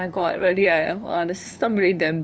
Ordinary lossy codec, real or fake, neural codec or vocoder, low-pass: none; fake; codec, 16 kHz, 2 kbps, FunCodec, trained on LibriTTS, 25 frames a second; none